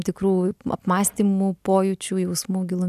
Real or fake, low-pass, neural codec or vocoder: real; 14.4 kHz; none